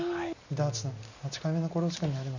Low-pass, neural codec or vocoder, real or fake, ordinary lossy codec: 7.2 kHz; none; real; none